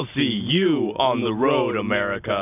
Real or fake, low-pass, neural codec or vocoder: real; 3.6 kHz; none